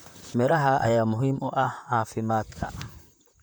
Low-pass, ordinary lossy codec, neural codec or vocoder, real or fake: none; none; vocoder, 44.1 kHz, 128 mel bands, Pupu-Vocoder; fake